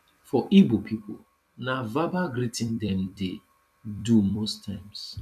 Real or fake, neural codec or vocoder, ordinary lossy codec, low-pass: fake; vocoder, 44.1 kHz, 128 mel bands every 256 samples, BigVGAN v2; MP3, 96 kbps; 14.4 kHz